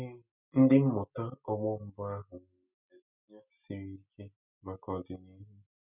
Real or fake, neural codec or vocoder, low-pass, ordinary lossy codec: real; none; 3.6 kHz; none